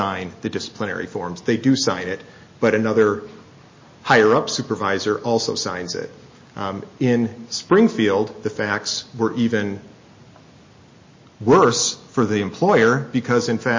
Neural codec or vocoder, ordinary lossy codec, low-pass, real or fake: none; MP3, 32 kbps; 7.2 kHz; real